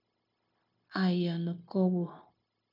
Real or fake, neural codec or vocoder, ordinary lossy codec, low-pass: fake; codec, 16 kHz, 0.9 kbps, LongCat-Audio-Codec; AAC, 48 kbps; 5.4 kHz